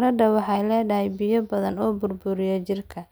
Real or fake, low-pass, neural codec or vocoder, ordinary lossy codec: real; none; none; none